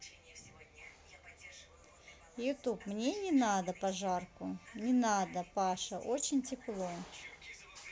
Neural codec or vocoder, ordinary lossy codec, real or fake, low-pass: none; none; real; none